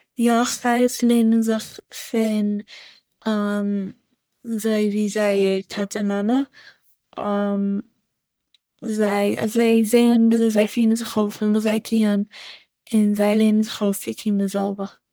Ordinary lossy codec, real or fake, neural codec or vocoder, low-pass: none; fake; codec, 44.1 kHz, 1.7 kbps, Pupu-Codec; none